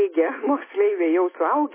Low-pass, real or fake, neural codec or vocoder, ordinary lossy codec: 3.6 kHz; real; none; MP3, 16 kbps